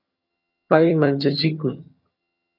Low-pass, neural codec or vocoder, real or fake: 5.4 kHz; vocoder, 22.05 kHz, 80 mel bands, HiFi-GAN; fake